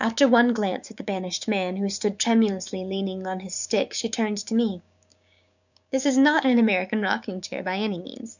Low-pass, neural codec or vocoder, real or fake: 7.2 kHz; codec, 16 kHz, 6 kbps, DAC; fake